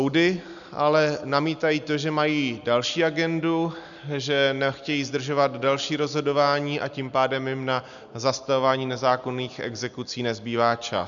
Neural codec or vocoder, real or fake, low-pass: none; real; 7.2 kHz